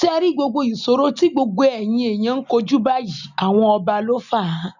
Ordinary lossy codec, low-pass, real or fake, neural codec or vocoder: none; 7.2 kHz; real; none